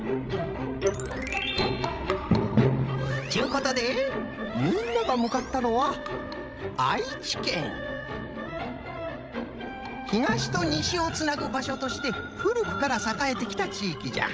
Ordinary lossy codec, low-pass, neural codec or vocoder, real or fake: none; none; codec, 16 kHz, 16 kbps, FreqCodec, larger model; fake